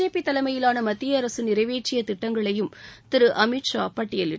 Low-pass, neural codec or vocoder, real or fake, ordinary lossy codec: none; none; real; none